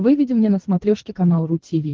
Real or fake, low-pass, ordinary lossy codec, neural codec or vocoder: fake; 7.2 kHz; Opus, 16 kbps; codec, 24 kHz, 3 kbps, HILCodec